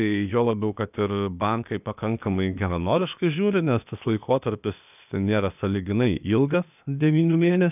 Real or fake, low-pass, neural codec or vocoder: fake; 3.6 kHz; codec, 16 kHz, 0.8 kbps, ZipCodec